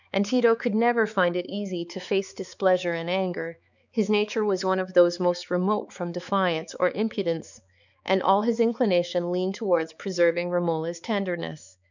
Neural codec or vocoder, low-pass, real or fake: codec, 16 kHz, 4 kbps, X-Codec, HuBERT features, trained on balanced general audio; 7.2 kHz; fake